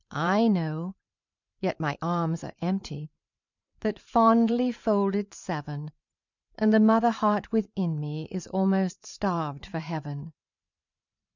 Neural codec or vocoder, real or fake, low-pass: vocoder, 44.1 kHz, 128 mel bands every 512 samples, BigVGAN v2; fake; 7.2 kHz